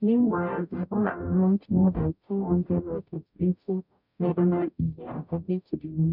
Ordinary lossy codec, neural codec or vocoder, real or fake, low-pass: none; codec, 44.1 kHz, 0.9 kbps, DAC; fake; 5.4 kHz